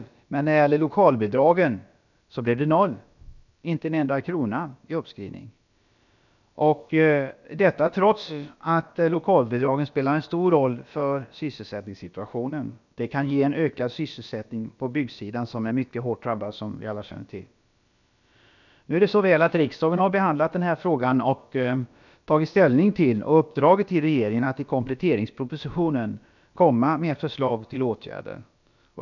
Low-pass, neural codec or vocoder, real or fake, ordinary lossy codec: 7.2 kHz; codec, 16 kHz, about 1 kbps, DyCAST, with the encoder's durations; fake; none